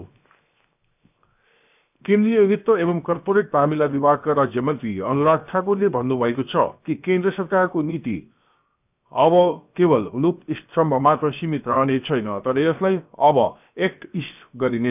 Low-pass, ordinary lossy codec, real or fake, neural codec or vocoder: 3.6 kHz; none; fake; codec, 16 kHz, 0.7 kbps, FocalCodec